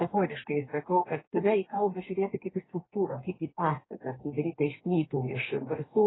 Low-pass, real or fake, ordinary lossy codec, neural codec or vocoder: 7.2 kHz; fake; AAC, 16 kbps; codec, 44.1 kHz, 2.6 kbps, DAC